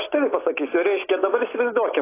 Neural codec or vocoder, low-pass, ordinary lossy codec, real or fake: none; 3.6 kHz; AAC, 16 kbps; real